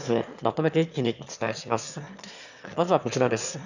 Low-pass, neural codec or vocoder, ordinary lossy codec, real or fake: 7.2 kHz; autoencoder, 22.05 kHz, a latent of 192 numbers a frame, VITS, trained on one speaker; none; fake